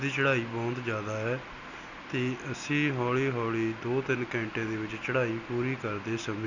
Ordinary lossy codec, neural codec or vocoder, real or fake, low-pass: none; none; real; 7.2 kHz